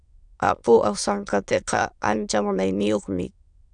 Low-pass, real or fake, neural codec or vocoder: 9.9 kHz; fake; autoencoder, 22.05 kHz, a latent of 192 numbers a frame, VITS, trained on many speakers